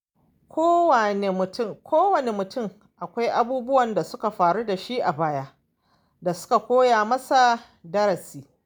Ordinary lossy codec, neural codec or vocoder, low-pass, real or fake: none; none; none; real